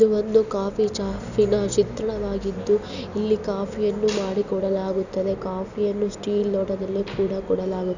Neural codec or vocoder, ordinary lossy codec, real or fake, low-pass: none; none; real; 7.2 kHz